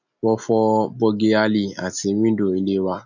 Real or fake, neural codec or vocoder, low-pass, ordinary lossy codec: real; none; 7.2 kHz; none